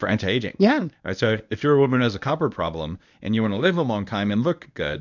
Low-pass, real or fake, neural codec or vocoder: 7.2 kHz; fake; codec, 24 kHz, 0.9 kbps, WavTokenizer, medium speech release version 1